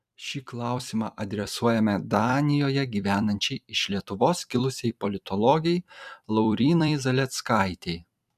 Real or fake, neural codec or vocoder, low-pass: fake; vocoder, 44.1 kHz, 128 mel bands every 256 samples, BigVGAN v2; 14.4 kHz